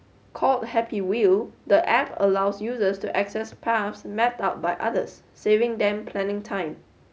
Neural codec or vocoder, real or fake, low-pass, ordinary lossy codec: none; real; none; none